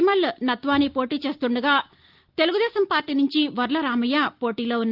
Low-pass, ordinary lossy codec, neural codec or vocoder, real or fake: 5.4 kHz; Opus, 32 kbps; none; real